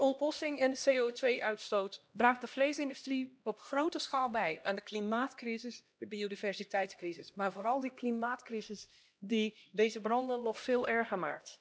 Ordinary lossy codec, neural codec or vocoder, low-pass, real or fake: none; codec, 16 kHz, 1 kbps, X-Codec, HuBERT features, trained on LibriSpeech; none; fake